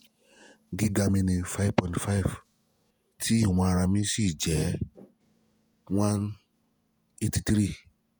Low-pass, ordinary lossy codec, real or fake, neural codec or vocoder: none; none; fake; vocoder, 48 kHz, 128 mel bands, Vocos